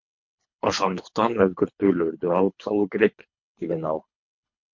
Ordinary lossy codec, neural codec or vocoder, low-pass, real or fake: MP3, 48 kbps; codec, 24 kHz, 3 kbps, HILCodec; 7.2 kHz; fake